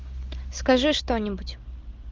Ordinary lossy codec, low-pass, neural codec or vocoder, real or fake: Opus, 32 kbps; 7.2 kHz; none; real